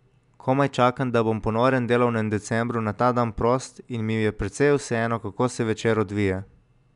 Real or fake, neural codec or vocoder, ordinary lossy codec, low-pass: real; none; none; 10.8 kHz